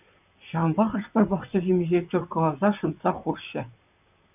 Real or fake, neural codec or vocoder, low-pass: fake; vocoder, 44.1 kHz, 128 mel bands, Pupu-Vocoder; 3.6 kHz